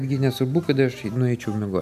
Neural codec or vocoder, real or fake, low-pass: none; real; 14.4 kHz